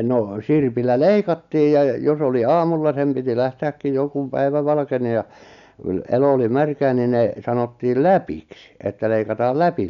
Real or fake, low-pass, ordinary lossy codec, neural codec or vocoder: real; 7.2 kHz; none; none